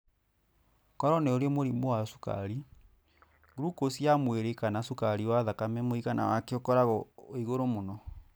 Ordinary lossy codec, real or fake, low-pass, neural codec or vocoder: none; real; none; none